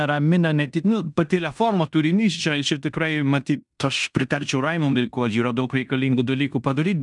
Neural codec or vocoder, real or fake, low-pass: codec, 16 kHz in and 24 kHz out, 0.9 kbps, LongCat-Audio-Codec, fine tuned four codebook decoder; fake; 10.8 kHz